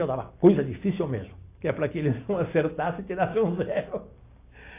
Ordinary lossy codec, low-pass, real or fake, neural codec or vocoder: AAC, 24 kbps; 3.6 kHz; real; none